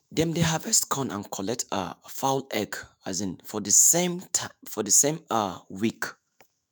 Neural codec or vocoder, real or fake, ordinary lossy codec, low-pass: autoencoder, 48 kHz, 128 numbers a frame, DAC-VAE, trained on Japanese speech; fake; none; none